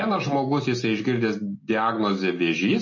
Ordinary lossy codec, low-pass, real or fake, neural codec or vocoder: MP3, 32 kbps; 7.2 kHz; real; none